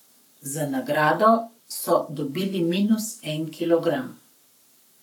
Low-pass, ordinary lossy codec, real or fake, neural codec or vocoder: 19.8 kHz; none; fake; codec, 44.1 kHz, 7.8 kbps, Pupu-Codec